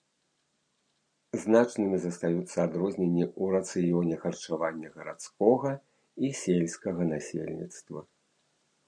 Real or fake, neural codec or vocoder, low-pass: real; none; 9.9 kHz